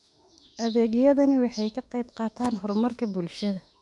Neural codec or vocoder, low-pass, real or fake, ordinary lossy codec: autoencoder, 48 kHz, 32 numbers a frame, DAC-VAE, trained on Japanese speech; 10.8 kHz; fake; none